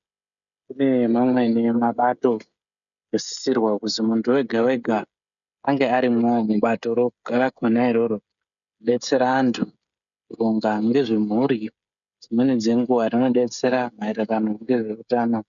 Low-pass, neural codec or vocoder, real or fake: 7.2 kHz; codec, 16 kHz, 16 kbps, FreqCodec, smaller model; fake